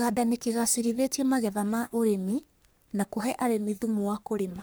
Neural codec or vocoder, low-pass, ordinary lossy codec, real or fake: codec, 44.1 kHz, 3.4 kbps, Pupu-Codec; none; none; fake